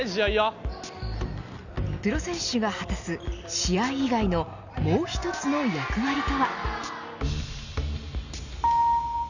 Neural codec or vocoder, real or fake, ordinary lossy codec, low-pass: none; real; none; 7.2 kHz